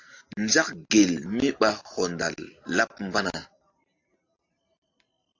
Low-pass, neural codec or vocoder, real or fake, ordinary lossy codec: 7.2 kHz; none; real; AAC, 48 kbps